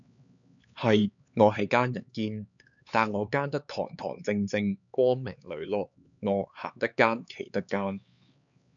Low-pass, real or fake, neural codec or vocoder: 7.2 kHz; fake; codec, 16 kHz, 4 kbps, X-Codec, HuBERT features, trained on LibriSpeech